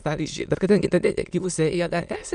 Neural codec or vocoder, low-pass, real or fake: autoencoder, 22.05 kHz, a latent of 192 numbers a frame, VITS, trained on many speakers; 9.9 kHz; fake